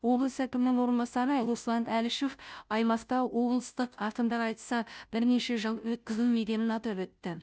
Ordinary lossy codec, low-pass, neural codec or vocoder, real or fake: none; none; codec, 16 kHz, 0.5 kbps, FunCodec, trained on Chinese and English, 25 frames a second; fake